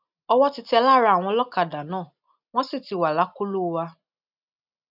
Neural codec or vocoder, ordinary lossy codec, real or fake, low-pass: none; none; real; 5.4 kHz